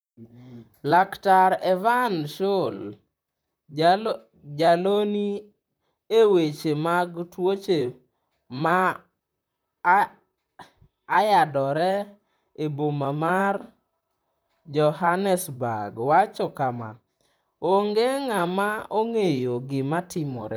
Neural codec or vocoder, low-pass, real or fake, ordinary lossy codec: vocoder, 44.1 kHz, 128 mel bands, Pupu-Vocoder; none; fake; none